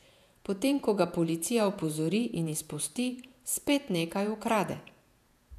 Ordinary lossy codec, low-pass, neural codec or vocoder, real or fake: none; 14.4 kHz; vocoder, 48 kHz, 128 mel bands, Vocos; fake